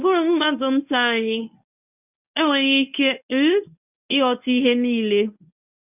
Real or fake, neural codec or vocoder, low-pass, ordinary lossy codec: fake; codec, 24 kHz, 0.9 kbps, WavTokenizer, medium speech release version 1; 3.6 kHz; none